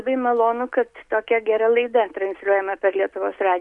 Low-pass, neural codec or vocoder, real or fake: 10.8 kHz; none; real